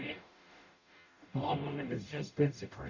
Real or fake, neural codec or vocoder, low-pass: fake; codec, 44.1 kHz, 0.9 kbps, DAC; 7.2 kHz